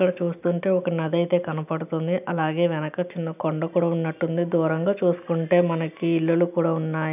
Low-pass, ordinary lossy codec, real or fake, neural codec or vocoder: 3.6 kHz; none; real; none